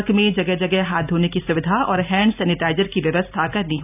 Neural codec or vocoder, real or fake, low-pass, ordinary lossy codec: none; real; 3.6 kHz; none